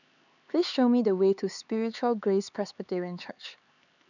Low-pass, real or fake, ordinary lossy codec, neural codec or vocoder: 7.2 kHz; fake; none; codec, 16 kHz, 4 kbps, X-Codec, HuBERT features, trained on LibriSpeech